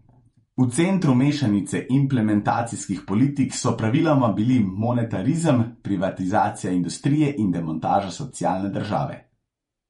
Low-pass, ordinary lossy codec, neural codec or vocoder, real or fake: 19.8 kHz; MP3, 48 kbps; vocoder, 48 kHz, 128 mel bands, Vocos; fake